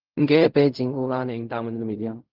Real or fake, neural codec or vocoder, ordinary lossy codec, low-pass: fake; codec, 16 kHz in and 24 kHz out, 0.4 kbps, LongCat-Audio-Codec, fine tuned four codebook decoder; Opus, 24 kbps; 5.4 kHz